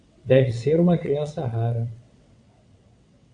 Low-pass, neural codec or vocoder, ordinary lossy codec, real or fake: 9.9 kHz; vocoder, 22.05 kHz, 80 mel bands, WaveNeXt; MP3, 64 kbps; fake